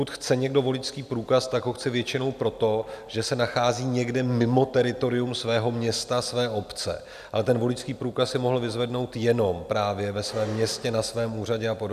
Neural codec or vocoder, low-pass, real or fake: none; 14.4 kHz; real